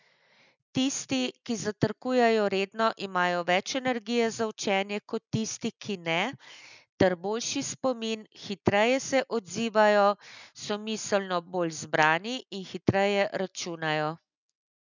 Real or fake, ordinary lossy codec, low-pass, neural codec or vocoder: real; none; 7.2 kHz; none